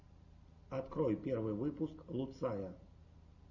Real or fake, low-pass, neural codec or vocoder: real; 7.2 kHz; none